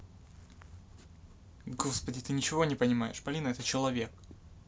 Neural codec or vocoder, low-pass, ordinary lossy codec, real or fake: none; none; none; real